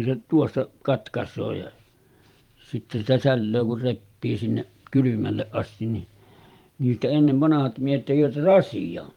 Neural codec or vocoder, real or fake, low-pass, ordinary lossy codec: vocoder, 44.1 kHz, 128 mel bands every 512 samples, BigVGAN v2; fake; 19.8 kHz; Opus, 32 kbps